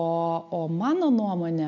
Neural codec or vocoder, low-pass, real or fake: none; 7.2 kHz; real